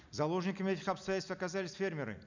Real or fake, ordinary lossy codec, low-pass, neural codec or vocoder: real; none; 7.2 kHz; none